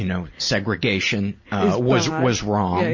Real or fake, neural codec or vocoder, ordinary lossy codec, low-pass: real; none; MP3, 32 kbps; 7.2 kHz